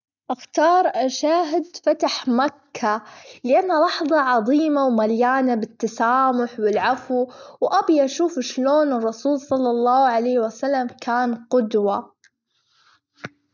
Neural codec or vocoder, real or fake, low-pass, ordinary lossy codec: none; real; 7.2 kHz; none